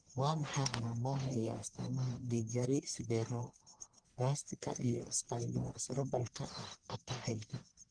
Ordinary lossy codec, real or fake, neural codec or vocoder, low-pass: Opus, 24 kbps; fake; codec, 44.1 kHz, 1.7 kbps, Pupu-Codec; 9.9 kHz